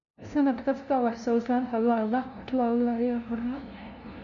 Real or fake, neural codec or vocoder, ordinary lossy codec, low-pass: fake; codec, 16 kHz, 0.5 kbps, FunCodec, trained on LibriTTS, 25 frames a second; none; 7.2 kHz